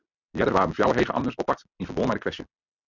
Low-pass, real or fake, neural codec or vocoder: 7.2 kHz; real; none